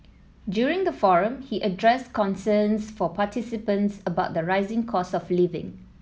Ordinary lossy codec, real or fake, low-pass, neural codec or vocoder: none; real; none; none